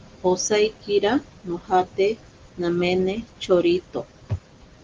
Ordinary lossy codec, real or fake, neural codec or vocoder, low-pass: Opus, 16 kbps; real; none; 7.2 kHz